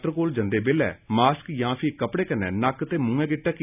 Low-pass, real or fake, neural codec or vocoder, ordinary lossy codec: 3.6 kHz; real; none; none